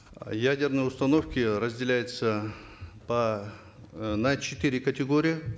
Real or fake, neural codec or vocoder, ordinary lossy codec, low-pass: real; none; none; none